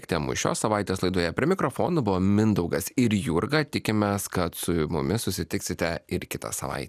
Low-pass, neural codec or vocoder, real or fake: 14.4 kHz; none; real